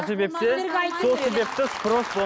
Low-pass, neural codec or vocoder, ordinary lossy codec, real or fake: none; none; none; real